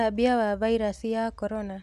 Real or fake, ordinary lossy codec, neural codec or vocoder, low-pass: real; none; none; 14.4 kHz